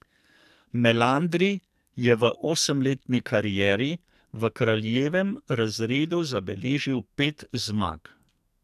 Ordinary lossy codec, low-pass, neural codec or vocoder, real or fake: none; 14.4 kHz; codec, 44.1 kHz, 2.6 kbps, SNAC; fake